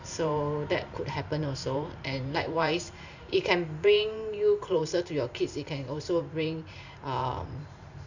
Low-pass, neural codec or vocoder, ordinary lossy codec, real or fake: 7.2 kHz; vocoder, 44.1 kHz, 128 mel bands every 512 samples, BigVGAN v2; none; fake